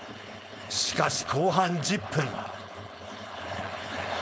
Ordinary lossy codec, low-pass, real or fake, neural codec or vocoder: none; none; fake; codec, 16 kHz, 4.8 kbps, FACodec